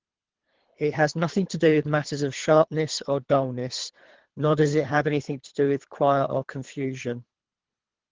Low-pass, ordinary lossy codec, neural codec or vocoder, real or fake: 7.2 kHz; Opus, 16 kbps; codec, 24 kHz, 3 kbps, HILCodec; fake